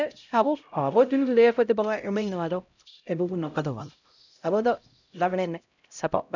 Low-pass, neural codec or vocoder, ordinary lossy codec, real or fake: 7.2 kHz; codec, 16 kHz, 0.5 kbps, X-Codec, HuBERT features, trained on LibriSpeech; none; fake